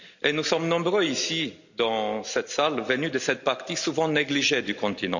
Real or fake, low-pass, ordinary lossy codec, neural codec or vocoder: real; 7.2 kHz; none; none